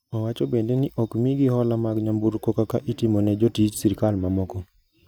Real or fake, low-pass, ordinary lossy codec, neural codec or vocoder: real; none; none; none